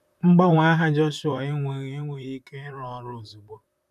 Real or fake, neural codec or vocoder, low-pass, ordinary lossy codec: fake; vocoder, 44.1 kHz, 128 mel bands, Pupu-Vocoder; 14.4 kHz; none